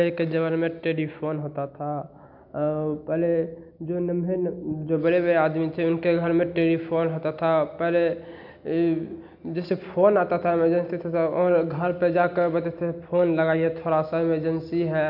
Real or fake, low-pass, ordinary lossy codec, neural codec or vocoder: real; 5.4 kHz; none; none